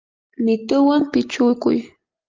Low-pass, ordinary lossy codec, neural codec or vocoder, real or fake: 7.2 kHz; Opus, 32 kbps; none; real